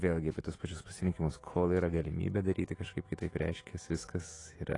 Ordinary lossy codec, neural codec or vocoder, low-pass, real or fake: AAC, 32 kbps; autoencoder, 48 kHz, 128 numbers a frame, DAC-VAE, trained on Japanese speech; 10.8 kHz; fake